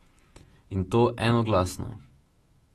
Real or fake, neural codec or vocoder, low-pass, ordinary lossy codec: fake; autoencoder, 48 kHz, 128 numbers a frame, DAC-VAE, trained on Japanese speech; 19.8 kHz; AAC, 32 kbps